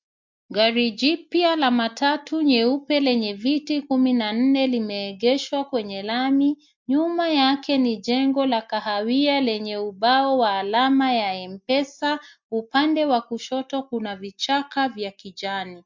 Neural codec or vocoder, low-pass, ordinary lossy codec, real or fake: none; 7.2 kHz; MP3, 48 kbps; real